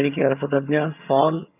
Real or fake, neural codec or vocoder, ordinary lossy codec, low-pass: fake; vocoder, 22.05 kHz, 80 mel bands, HiFi-GAN; none; 3.6 kHz